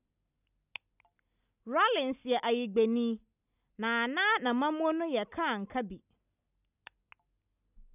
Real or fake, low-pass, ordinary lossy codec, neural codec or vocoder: real; 3.6 kHz; none; none